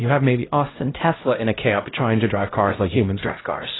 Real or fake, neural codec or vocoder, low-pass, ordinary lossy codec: fake; codec, 16 kHz, 0.5 kbps, X-Codec, HuBERT features, trained on LibriSpeech; 7.2 kHz; AAC, 16 kbps